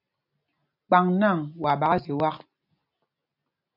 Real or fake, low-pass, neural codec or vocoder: real; 5.4 kHz; none